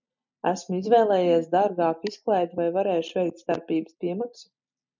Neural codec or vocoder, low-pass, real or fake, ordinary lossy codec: none; 7.2 kHz; real; MP3, 64 kbps